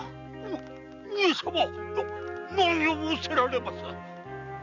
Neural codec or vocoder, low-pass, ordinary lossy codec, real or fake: none; 7.2 kHz; none; real